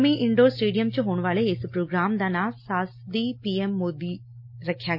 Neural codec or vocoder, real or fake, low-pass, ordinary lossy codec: none; real; 5.4 kHz; MP3, 32 kbps